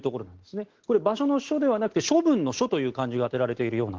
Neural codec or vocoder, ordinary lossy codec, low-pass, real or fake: none; Opus, 16 kbps; 7.2 kHz; real